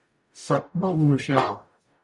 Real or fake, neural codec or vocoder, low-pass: fake; codec, 44.1 kHz, 0.9 kbps, DAC; 10.8 kHz